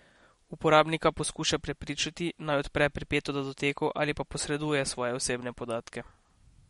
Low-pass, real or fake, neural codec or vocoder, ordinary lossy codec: 19.8 kHz; real; none; MP3, 48 kbps